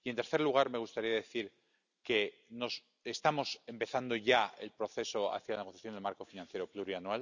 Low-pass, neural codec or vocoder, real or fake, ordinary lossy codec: 7.2 kHz; none; real; none